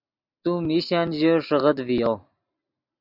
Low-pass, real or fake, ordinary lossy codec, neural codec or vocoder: 5.4 kHz; real; AAC, 48 kbps; none